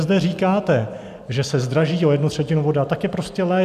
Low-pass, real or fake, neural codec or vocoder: 14.4 kHz; real; none